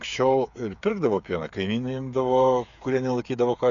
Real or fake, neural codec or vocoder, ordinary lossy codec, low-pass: fake; codec, 16 kHz, 8 kbps, FreqCodec, smaller model; Opus, 64 kbps; 7.2 kHz